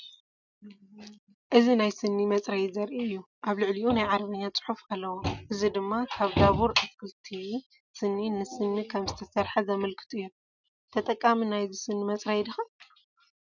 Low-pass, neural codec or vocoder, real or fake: 7.2 kHz; none; real